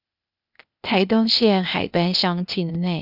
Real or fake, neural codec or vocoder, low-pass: fake; codec, 16 kHz, 0.8 kbps, ZipCodec; 5.4 kHz